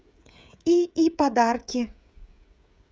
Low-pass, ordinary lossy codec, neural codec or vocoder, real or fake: none; none; codec, 16 kHz, 16 kbps, FreqCodec, smaller model; fake